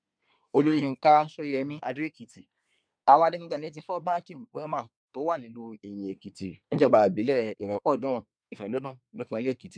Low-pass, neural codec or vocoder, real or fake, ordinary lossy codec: 9.9 kHz; codec, 24 kHz, 1 kbps, SNAC; fake; MP3, 96 kbps